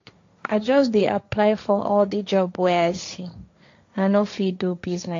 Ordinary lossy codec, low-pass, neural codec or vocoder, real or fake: AAC, 48 kbps; 7.2 kHz; codec, 16 kHz, 1.1 kbps, Voila-Tokenizer; fake